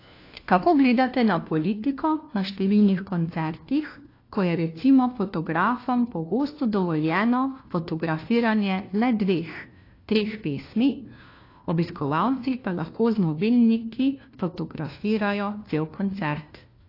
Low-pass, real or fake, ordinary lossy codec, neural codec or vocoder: 5.4 kHz; fake; AAC, 32 kbps; codec, 16 kHz, 1 kbps, FunCodec, trained on LibriTTS, 50 frames a second